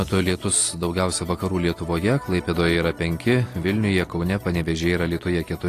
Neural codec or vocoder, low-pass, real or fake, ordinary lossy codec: none; 14.4 kHz; real; AAC, 48 kbps